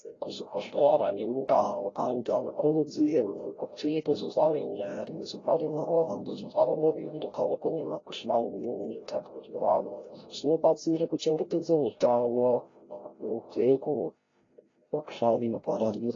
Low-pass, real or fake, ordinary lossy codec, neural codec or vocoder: 7.2 kHz; fake; AAC, 32 kbps; codec, 16 kHz, 0.5 kbps, FreqCodec, larger model